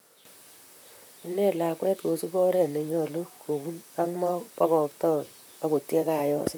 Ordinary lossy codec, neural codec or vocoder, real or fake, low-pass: none; vocoder, 44.1 kHz, 128 mel bands, Pupu-Vocoder; fake; none